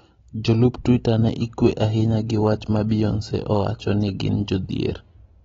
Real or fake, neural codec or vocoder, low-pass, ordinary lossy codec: real; none; 7.2 kHz; AAC, 24 kbps